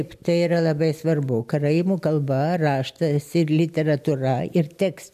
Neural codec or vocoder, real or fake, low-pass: none; real; 14.4 kHz